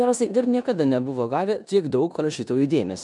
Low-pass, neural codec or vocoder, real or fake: 10.8 kHz; codec, 16 kHz in and 24 kHz out, 0.9 kbps, LongCat-Audio-Codec, four codebook decoder; fake